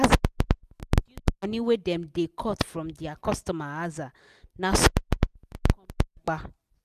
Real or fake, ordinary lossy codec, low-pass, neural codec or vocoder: real; none; 14.4 kHz; none